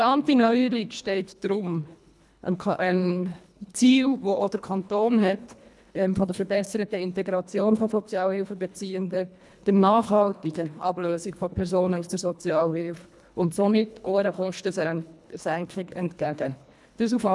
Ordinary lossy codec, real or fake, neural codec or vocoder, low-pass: none; fake; codec, 24 kHz, 1.5 kbps, HILCodec; none